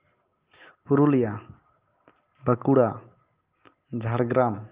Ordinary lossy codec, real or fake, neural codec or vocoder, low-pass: Opus, 32 kbps; real; none; 3.6 kHz